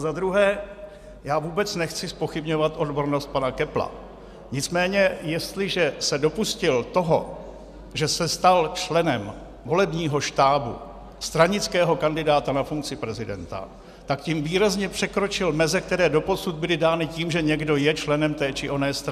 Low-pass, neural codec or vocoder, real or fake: 14.4 kHz; none; real